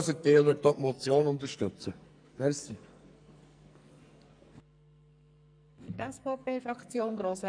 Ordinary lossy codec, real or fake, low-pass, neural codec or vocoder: none; fake; 9.9 kHz; codec, 44.1 kHz, 2.6 kbps, SNAC